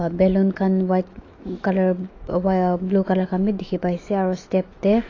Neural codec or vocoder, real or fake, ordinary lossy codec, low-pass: autoencoder, 48 kHz, 128 numbers a frame, DAC-VAE, trained on Japanese speech; fake; AAC, 32 kbps; 7.2 kHz